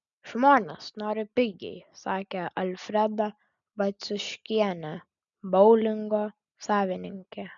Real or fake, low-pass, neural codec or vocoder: real; 7.2 kHz; none